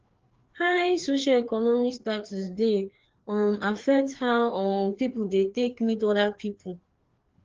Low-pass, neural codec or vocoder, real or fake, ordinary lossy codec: 7.2 kHz; codec, 16 kHz, 2 kbps, FreqCodec, larger model; fake; Opus, 16 kbps